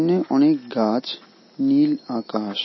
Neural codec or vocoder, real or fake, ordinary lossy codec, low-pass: none; real; MP3, 24 kbps; 7.2 kHz